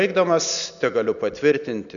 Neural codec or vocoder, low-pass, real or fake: none; 7.2 kHz; real